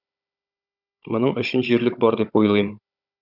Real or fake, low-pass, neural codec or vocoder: fake; 5.4 kHz; codec, 16 kHz, 16 kbps, FunCodec, trained on Chinese and English, 50 frames a second